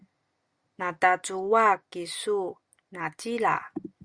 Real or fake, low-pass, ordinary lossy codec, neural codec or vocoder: real; 9.9 kHz; Opus, 64 kbps; none